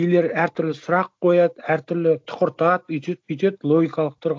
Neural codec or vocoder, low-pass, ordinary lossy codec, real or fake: none; none; none; real